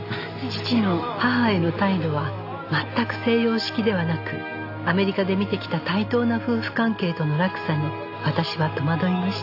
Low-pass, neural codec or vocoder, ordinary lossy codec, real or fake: 5.4 kHz; none; AAC, 32 kbps; real